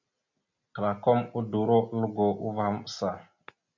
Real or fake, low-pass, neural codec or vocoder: real; 7.2 kHz; none